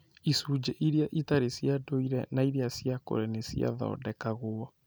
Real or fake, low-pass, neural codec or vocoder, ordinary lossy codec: real; none; none; none